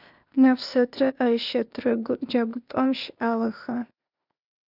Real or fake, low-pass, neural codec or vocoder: fake; 5.4 kHz; codec, 16 kHz, 0.8 kbps, ZipCodec